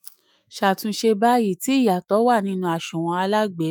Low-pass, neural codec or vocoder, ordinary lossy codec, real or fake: none; autoencoder, 48 kHz, 128 numbers a frame, DAC-VAE, trained on Japanese speech; none; fake